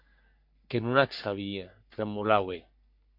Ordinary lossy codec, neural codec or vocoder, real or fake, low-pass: MP3, 48 kbps; codec, 44.1 kHz, 3.4 kbps, Pupu-Codec; fake; 5.4 kHz